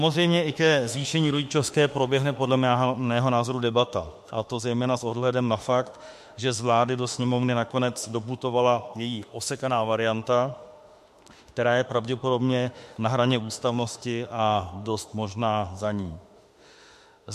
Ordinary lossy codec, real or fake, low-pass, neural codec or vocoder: MP3, 64 kbps; fake; 14.4 kHz; autoencoder, 48 kHz, 32 numbers a frame, DAC-VAE, trained on Japanese speech